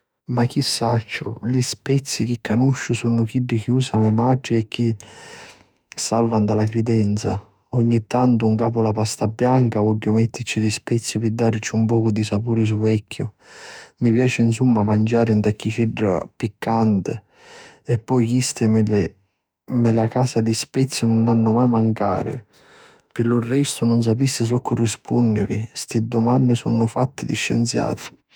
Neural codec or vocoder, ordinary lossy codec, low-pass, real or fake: autoencoder, 48 kHz, 32 numbers a frame, DAC-VAE, trained on Japanese speech; none; none; fake